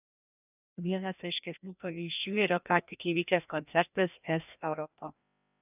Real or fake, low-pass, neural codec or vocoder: fake; 3.6 kHz; codec, 16 kHz, 1 kbps, FreqCodec, larger model